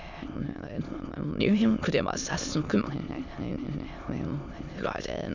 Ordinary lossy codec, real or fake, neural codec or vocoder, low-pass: none; fake; autoencoder, 22.05 kHz, a latent of 192 numbers a frame, VITS, trained on many speakers; 7.2 kHz